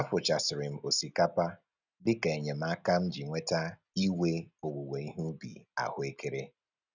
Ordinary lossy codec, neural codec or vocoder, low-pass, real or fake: none; none; 7.2 kHz; real